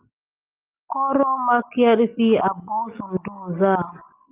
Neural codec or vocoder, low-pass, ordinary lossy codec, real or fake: none; 3.6 kHz; Opus, 24 kbps; real